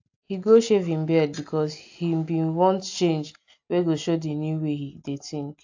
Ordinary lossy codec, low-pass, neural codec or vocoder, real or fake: AAC, 48 kbps; 7.2 kHz; none; real